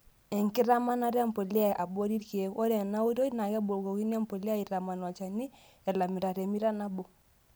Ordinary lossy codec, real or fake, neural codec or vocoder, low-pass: none; real; none; none